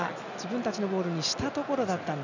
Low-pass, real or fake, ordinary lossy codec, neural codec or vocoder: 7.2 kHz; real; none; none